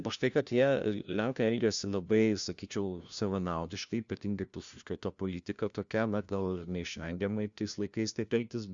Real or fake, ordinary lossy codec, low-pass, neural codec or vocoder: fake; Opus, 64 kbps; 7.2 kHz; codec, 16 kHz, 1 kbps, FunCodec, trained on LibriTTS, 50 frames a second